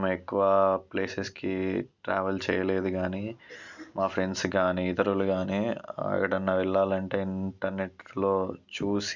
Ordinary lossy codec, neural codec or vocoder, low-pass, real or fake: none; none; 7.2 kHz; real